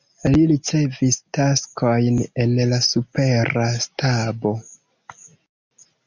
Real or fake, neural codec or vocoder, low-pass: real; none; 7.2 kHz